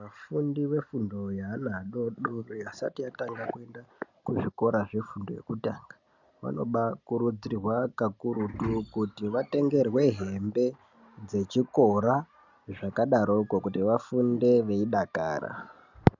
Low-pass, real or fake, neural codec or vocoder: 7.2 kHz; real; none